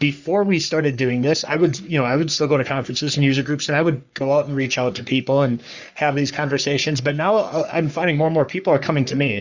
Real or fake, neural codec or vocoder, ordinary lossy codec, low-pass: fake; codec, 44.1 kHz, 3.4 kbps, Pupu-Codec; Opus, 64 kbps; 7.2 kHz